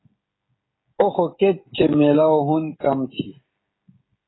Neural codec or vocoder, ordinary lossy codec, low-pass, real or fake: codec, 16 kHz, 16 kbps, FreqCodec, smaller model; AAC, 16 kbps; 7.2 kHz; fake